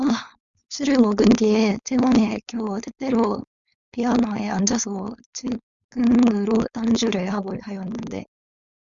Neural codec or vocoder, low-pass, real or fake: codec, 16 kHz, 4.8 kbps, FACodec; 7.2 kHz; fake